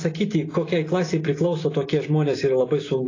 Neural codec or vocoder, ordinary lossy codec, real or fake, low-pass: none; AAC, 32 kbps; real; 7.2 kHz